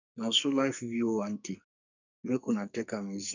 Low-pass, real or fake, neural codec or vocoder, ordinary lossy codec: 7.2 kHz; fake; codec, 44.1 kHz, 2.6 kbps, SNAC; none